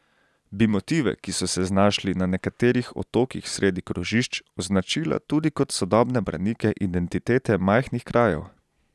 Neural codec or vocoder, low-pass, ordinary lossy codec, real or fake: none; none; none; real